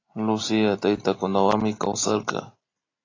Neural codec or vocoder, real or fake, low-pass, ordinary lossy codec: none; real; 7.2 kHz; AAC, 32 kbps